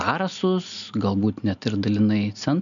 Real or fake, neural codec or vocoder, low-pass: real; none; 7.2 kHz